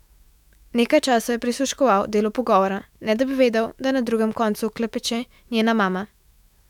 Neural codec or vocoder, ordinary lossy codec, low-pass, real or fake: autoencoder, 48 kHz, 128 numbers a frame, DAC-VAE, trained on Japanese speech; none; 19.8 kHz; fake